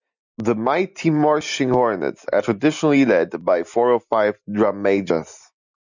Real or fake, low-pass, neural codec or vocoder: real; 7.2 kHz; none